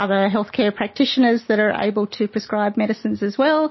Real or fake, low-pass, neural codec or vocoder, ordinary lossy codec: real; 7.2 kHz; none; MP3, 24 kbps